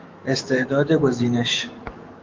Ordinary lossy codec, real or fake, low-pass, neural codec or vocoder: Opus, 32 kbps; fake; 7.2 kHz; autoencoder, 48 kHz, 128 numbers a frame, DAC-VAE, trained on Japanese speech